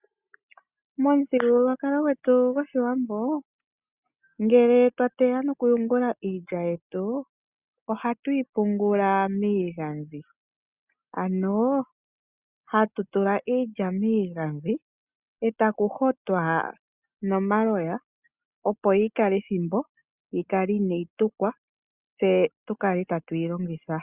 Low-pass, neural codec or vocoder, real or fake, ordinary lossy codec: 3.6 kHz; none; real; Opus, 64 kbps